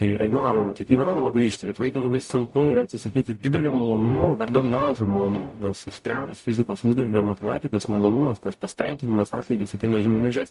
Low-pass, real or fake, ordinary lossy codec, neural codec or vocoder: 14.4 kHz; fake; MP3, 48 kbps; codec, 44.1 kHz, 0.9 kbps, DAC